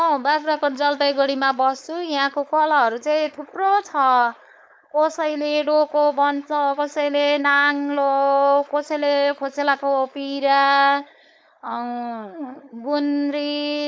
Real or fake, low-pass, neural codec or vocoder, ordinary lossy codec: fake; none; codec, 16 kHz, 4.8 kbps, FACodec; none